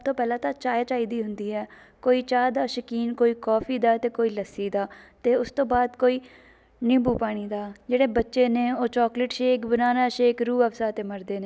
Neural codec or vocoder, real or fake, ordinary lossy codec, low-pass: none; real; none; none